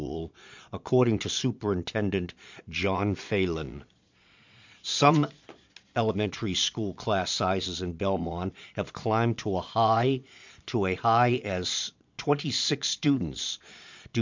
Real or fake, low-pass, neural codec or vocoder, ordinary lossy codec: fake; 7.2 kHz; vocoder, 22.05 kHz, 80 mel bands, Vocos; MP3, 64 kbps